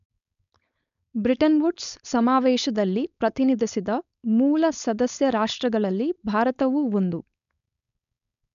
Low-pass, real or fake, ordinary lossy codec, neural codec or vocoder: 7.2 kHz; fake; MP3, 96 kbps; codec, 16 kHz, 4.8 kbps, FACodec